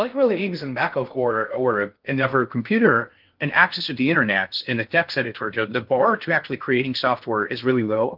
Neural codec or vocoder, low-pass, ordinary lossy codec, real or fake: codec, 16 kHz in and 24 kHz out, 0.6 kbps, FocalCodec, streaming, 2048 codes; 5.4 kHz; Opus, 32 kbps; fake